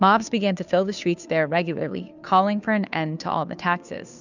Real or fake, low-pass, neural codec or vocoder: fake; 7.2 kHz; codec, 16 kHz, 2 kbps, FunCodec, trained on Chinese and English, 25 frames a second